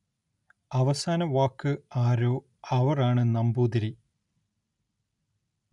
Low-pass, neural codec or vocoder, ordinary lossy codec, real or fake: 10.8 kHz; none; none; real